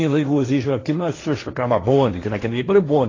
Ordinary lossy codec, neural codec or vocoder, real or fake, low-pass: AAC, 32 kbps; codec, 16 kHz, 1.1 kbps, Voila-Tokenizer; fake; 7.2 kHz